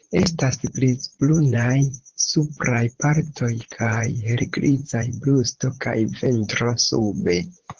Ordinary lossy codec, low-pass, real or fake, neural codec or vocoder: Opus, 16 kbps; 7.2 kHz; fake; vocoder, 44.1 kHz, 80 mel bands, Vocos